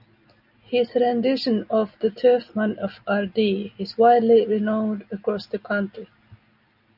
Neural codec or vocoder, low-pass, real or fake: none; 5.4 kHz; real